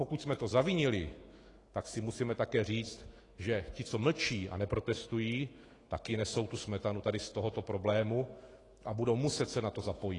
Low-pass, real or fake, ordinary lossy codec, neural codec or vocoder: 10.8 kHz; fake; AAC, 32 kbps; autoencoder, 48 kHz, 128 numbers a frame, DAC-VAE, trained on Japanese speech